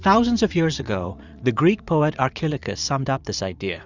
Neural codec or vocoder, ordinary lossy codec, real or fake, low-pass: vocoder, 44.1 kHz, 80 mel bands, Vocos; Opus, 64 kbps; fake; 7.2 kHz